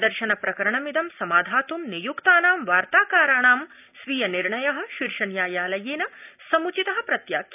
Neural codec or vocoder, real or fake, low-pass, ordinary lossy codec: none; real; 3.6 kHz; none